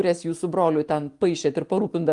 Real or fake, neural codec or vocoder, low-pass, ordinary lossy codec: real; none; 10.8 kHz; Opus, 24 kbps